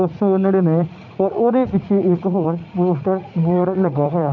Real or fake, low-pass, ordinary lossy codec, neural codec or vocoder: fake; 7.2 kHz; none; vocoder, 44.1 kHz, 80 mel bands, Vocos